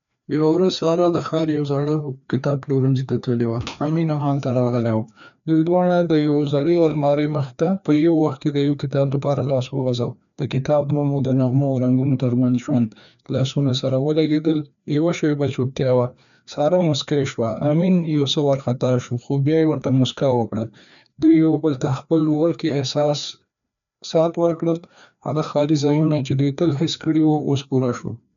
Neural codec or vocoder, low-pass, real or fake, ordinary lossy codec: codec, 16 kHz, 2 kbps, FreqCodec, larger model; 7.2 kHz; fake; none